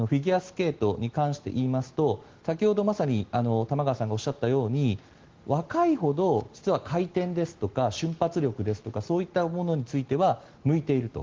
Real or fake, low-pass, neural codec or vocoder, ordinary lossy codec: real; 7.2 kHz; none; Opus, 16 kbps